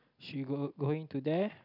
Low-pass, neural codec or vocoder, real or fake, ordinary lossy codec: 5.4 kHz; none; real; none